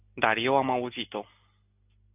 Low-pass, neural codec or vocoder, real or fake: 3.6 kHz; none; real